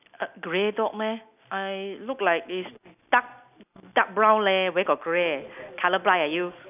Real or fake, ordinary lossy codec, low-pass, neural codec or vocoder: real; none; 3.6 kHz; none